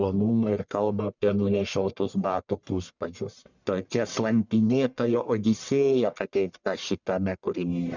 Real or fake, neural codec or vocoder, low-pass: fake; codec, 44.1 kHz, 1.7 kbps, Pupu-Codec; 7.2 kHz